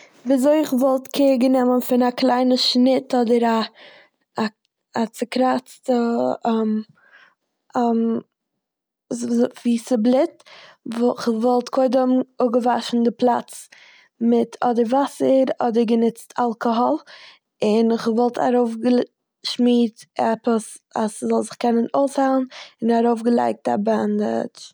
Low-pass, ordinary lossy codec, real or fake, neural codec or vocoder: none; none; real; none